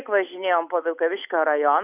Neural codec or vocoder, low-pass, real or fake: none; 3.6 kHz; real